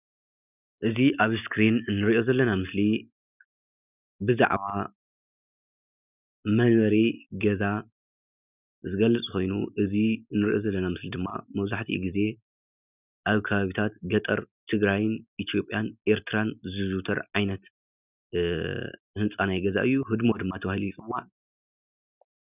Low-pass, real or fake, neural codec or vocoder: 3.6 kHz; real; none